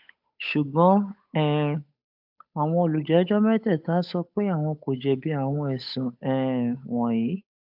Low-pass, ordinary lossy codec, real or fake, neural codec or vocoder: 5.4 kHz; AAC, 48 kbps; fake; codec, 16 kHz, 8 kbps, FunCodec, trained on Chinese and English, 25 frames a second